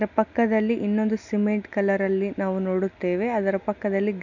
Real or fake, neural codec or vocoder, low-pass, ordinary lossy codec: real; none; 7.2 kHz; none